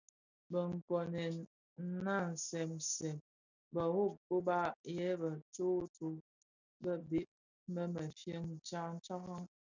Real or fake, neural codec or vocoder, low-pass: real; none; 7.2 kHz